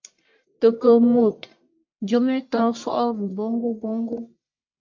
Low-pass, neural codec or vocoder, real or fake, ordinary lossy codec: 7.2 kHz; codec, 44.1 kHz, 1.7 kbps, Pupu-Codec; fake; MP3, 48 kbps